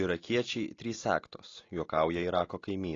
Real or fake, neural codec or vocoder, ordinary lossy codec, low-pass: real; none; AAC, 32 kbps; 7.2 kHz